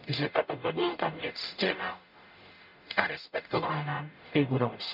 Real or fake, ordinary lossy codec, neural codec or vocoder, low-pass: fake; none; codec, 44.1 kHz, 0.9 kbps, DAC; 5.4 kHz